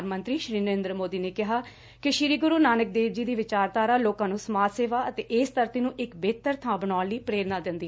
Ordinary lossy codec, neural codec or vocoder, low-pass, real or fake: none; none; none; real